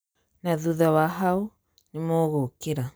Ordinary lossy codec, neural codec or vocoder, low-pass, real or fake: none; none; none; real